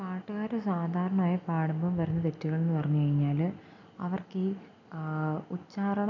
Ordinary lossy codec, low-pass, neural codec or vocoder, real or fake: none; 7.2 kHz; none; real